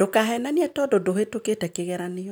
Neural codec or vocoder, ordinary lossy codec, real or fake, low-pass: none; none; real; none